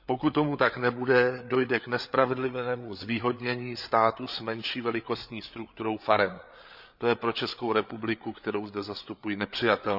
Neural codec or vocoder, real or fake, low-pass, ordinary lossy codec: codec, 16 kHz, 8 kbps, FreqCodec, larger model; fake; 5.4 kHz; none